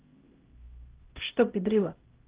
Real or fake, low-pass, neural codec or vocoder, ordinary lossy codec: fake; 3.6 kHz; codec, 16 kHz, 0.5 kbps, X-Codec, HuBERT features, trained on LibriSpeech; Opus, 16 kbps